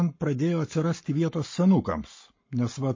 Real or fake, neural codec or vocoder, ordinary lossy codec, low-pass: fake; codec, 44.1 kHz, 7.8 kbps, Pupu-Codec; MP3, 32 kbps; 7.2 kHz